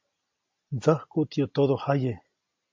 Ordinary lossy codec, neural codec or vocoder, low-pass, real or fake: MP3, 48 kbps; none; 7.2 kHz; real